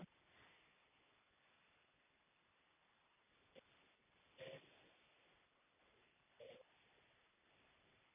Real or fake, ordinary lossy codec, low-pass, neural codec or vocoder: real; none; 3.6 kHz; none